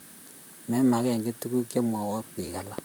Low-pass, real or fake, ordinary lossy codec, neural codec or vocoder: none; fake; none; vocoder, 44.1 kHz, 128 mel bands, Pupu-Vocoder